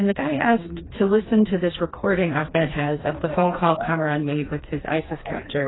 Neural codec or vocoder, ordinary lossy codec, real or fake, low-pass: codec, 16 kHz, 2 kbps, FreqCodec, smaller model; AAC, 16 kbps; fake; 7.2 kHz